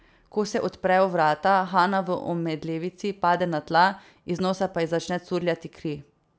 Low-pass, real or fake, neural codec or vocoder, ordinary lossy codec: none; real; none; none